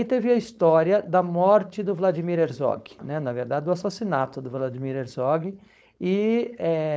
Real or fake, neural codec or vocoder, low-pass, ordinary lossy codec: fake; codec, 16 kHz, 4.8 kbps, FACodec; none; none